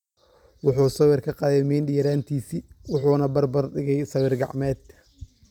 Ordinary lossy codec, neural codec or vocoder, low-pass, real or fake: none; vocoder, 44.1 kHz, 128 mel bands every 256 samples, BigVGAN v2; 19.8 kHz; fake